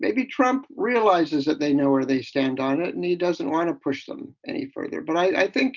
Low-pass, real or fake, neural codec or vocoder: 7.2 kHz; real; none